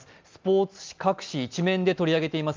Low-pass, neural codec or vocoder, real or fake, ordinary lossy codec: 7.2 kHz; none; real; Opus, 24 kbps